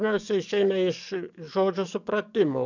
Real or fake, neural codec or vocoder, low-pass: fake; codec, 44.1 kHz, 7.8 kbps, DAC; 7.2 kHz